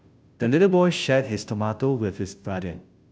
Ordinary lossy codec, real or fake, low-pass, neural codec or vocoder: none; fake; none; codec, 16 kHz, 0.5 kbps, FunCodec, trained on Chinese and English, 25 frames a second